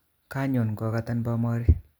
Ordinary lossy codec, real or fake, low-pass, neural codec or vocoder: none; real; none; none